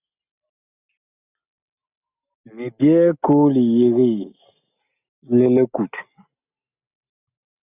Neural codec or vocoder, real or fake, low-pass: none; real; 3.6 kHz